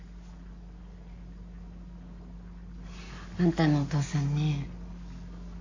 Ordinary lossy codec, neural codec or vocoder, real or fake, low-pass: AAC, 48 kbps; none; real; 7.2 kHz